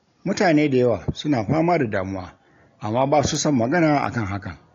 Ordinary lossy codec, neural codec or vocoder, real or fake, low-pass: AAC, 48 kbps; none; real; 7.2 kHz